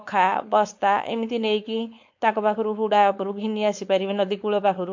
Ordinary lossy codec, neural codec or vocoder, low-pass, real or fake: MP3, 48 kbps; codec, 16 kHz, 4.8 kbps, FACodec; 7.2 kHz; fake